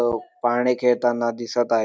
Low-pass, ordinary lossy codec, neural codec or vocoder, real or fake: none; none; none; real